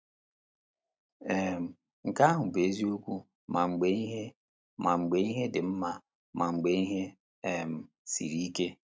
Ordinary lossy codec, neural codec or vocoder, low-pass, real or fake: none; none; none; real